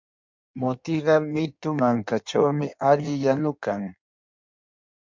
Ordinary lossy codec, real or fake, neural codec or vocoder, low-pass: MP3, 64 kbps; fake; codec, 16 kHz in and 24 kHz out, 1.1 kbps, FireRedTTS-2 codec; 7.2 kHz